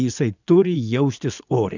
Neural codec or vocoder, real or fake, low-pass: vocoder, 24 kHz, 100 mel bands, Vocos; fake; 7.2 kHz